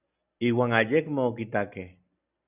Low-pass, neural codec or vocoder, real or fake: 3.6 kHz; none; real